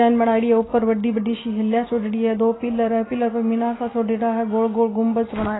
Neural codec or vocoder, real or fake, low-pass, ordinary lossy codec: none; real; 7.2 kHz; AAC, 16 kbps